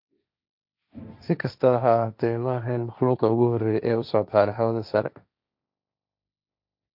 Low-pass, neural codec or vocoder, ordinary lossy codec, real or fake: 5.4 kHz; codec, 16 kHz, 1.1 kbps, Voila-Tokenizer; none; fake